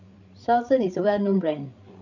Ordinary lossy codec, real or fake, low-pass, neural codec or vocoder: none; fake; 7.2 kHz; codec, 16 kHz, 8 kbps, FreqCodec, larger model